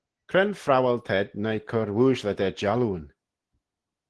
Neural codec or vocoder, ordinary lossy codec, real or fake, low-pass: none; Opus, 16 kbps; real; 10.8 kHz